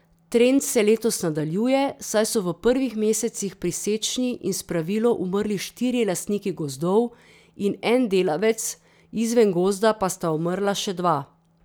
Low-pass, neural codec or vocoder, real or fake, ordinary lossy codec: none; none; real; none